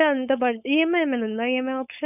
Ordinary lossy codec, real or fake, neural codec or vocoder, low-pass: none; fake; codec, 16 kHz, 4.8 kbps, FACodec; 3.6 kHz